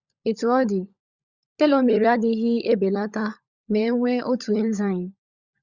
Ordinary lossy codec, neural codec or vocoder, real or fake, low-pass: Opus, 64 kbps; codec, 16 kHz, 16 kbps, FunCodec, trained on LibriTTS, 50 frames a second; fake; 7.2 kHz